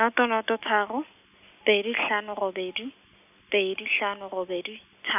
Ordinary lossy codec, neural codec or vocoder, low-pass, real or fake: none; none; 3.6 kHz; real